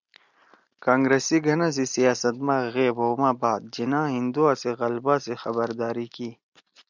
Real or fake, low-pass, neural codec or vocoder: real; 7.2 kHz; none